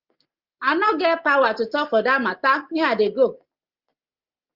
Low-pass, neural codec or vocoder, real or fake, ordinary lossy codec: 5.4 kHz; none; real; Opus, 24 kbps